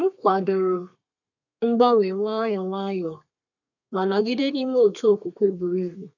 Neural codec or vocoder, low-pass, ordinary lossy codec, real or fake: codec, 32 kHz, 1.9 kbps, SNAC; 7.2 kHz; none; fake